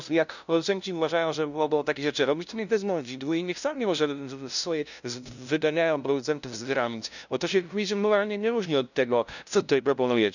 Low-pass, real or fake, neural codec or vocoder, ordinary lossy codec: 7.2 kHz; fake; codec, 16 kHz, 0.5 kbps, FunCodec, trained on LibriTTS, 25 frames a second; none